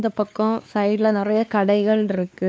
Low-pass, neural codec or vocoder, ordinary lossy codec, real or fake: none; codec, 16 kHz, 2 kbps, X-Codec, WavLM features, trained on Multilingual LibriSpeech; none; fake